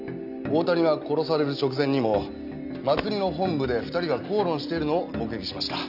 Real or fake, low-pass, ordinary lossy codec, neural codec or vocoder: real; 5.4 kHz; none; none